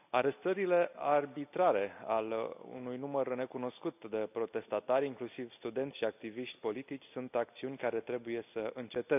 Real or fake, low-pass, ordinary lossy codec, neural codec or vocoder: real; 3.6 kHz; none; none